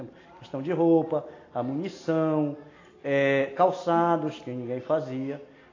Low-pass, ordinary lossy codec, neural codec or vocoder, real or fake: 7.2 kHz; AAC, 32 kbps; none; real